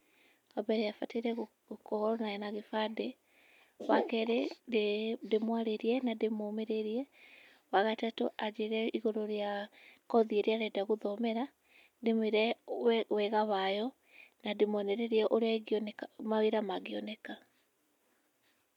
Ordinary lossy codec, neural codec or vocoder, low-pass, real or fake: none; none; 19.8 kHz; real